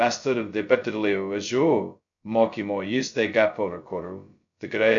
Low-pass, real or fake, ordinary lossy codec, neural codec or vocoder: 7.2 kHz; fake; MP3, 64 kbps; codec, 16 kHz, 0.2 kbps, FocalCodec